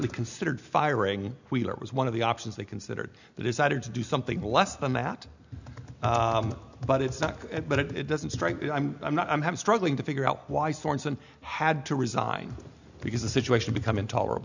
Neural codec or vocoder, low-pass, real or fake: none; 7.2 kHz; real